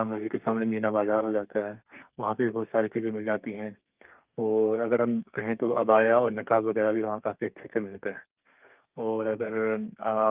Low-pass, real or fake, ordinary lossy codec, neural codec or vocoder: 3.6 kHz; fake; Opus, 24 kbps; codec, 32 kHz, 1.9 kbps, SNAC